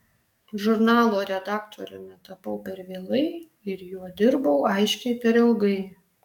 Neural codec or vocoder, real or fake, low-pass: codec, 44.1 kHz, 7.8 kbps, DAC; fake; 19.8 kHz